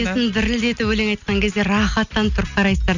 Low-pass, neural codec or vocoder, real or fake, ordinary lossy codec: 7.2 kHz; none; real; none